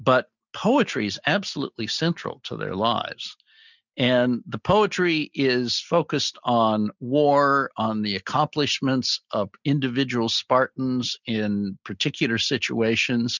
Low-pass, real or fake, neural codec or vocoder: 7.2 kHz; real; none